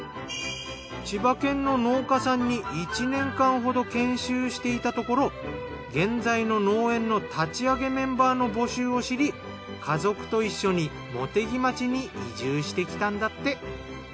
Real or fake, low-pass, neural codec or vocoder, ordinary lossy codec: real; none; none; none